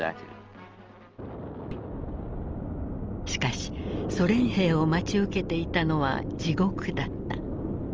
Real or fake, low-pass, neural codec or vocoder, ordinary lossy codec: real; 7.2 kHz; none; Opus, 24 kbps